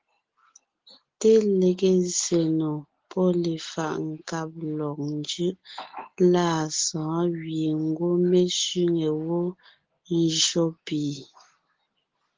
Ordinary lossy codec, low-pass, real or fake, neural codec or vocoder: Opus, 16 kbps; 7.2 kHz; real; none